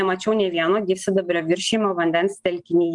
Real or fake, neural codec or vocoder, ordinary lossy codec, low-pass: real; none; Opus, 32 kbps; 10.8 kHz